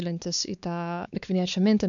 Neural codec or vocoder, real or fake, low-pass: codec, 16 kHz, 4 kbps, X-Codec, WavLM features, trained on Multilingual LibriSpeech; fake; 7.2 kHz